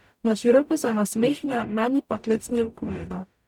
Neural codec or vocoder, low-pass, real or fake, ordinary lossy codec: codec, 44.1 kHz, 0.9 kbps, DAC; 19.8 kHz; fake; none